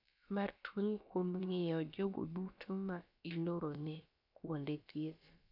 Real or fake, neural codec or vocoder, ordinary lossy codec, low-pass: fake; codec, 16 kHz, about 1 kbps, DyCAST, with the encoder's durations; none; 5.4 kHz